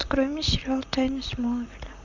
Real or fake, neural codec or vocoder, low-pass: fake; vocoder, 44.1 kHz, 128 mel bands, Pupu-Vocoder; 7.2 kHz